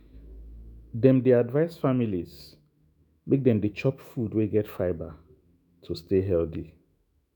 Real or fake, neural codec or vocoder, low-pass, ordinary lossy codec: fake; autoencoder, 48 kHz, 128 numbers a frame, DAC-VAE, trained on Japanese speech; 19.8 kHz; none